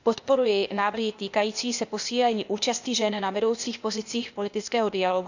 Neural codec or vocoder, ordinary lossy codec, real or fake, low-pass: codec, 16 kHz, 0.8 kbps, ZipCodec; none; fake; 7.2 kHz